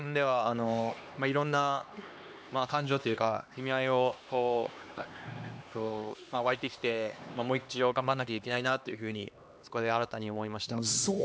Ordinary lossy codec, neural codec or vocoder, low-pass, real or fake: none; codec, 16 kHz, 2 kbps, X-Codec, HuBERT features, trained on LibriSpeech; none; fake